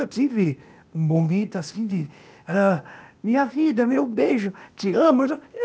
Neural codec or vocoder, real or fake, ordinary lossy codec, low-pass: codec, 16 kHz, 0.8 kbps, ZipCodec; fake; none; none